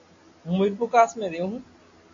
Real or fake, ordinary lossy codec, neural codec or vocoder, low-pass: real; AAC, 48 kbps; none; 7.2 kHz